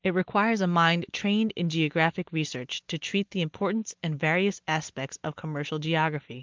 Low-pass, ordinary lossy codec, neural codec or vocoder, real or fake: 7.2 kHz; Opus, 32 kbps; none; real